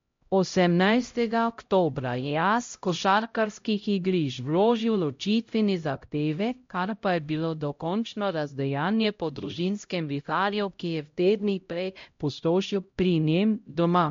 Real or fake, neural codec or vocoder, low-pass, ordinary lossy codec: fake; codec, 16 kHz, 0.5 kbps, X-Codec, HuBERT features, trained on LibriSpeech; 7.2 kHz; MP3, 48 kbps